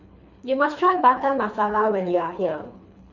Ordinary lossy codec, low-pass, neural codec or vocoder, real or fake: none; 7.2 kHz; codec, 24 kHz, 3 kbps, HILCodec; fake